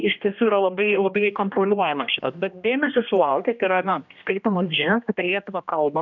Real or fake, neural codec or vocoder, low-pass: fake; codec, 16 kHz, 1 kbps, X-Codec, HuBERT features, trained on general audio; 7.2 kHz